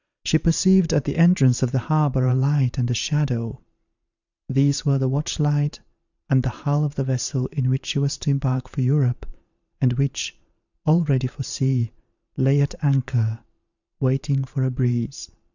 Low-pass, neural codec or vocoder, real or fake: 7.2 kHz; vocoder, 44.1 kHz, 128 mel bands every 512 samples, BigVGAN v2; fake